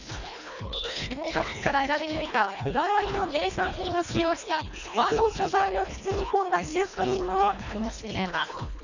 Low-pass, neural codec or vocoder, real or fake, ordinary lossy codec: 7.2 kHz; codec, 24 kHz, 1.5 kbps, HILCodec; fake; none